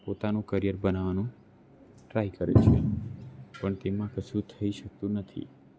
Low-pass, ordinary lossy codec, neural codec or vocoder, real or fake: none; none; none; real